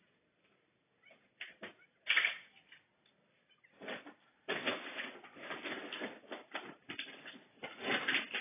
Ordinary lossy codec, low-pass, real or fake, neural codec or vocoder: AAC, 16 kbps; 3.6 kHz; real; none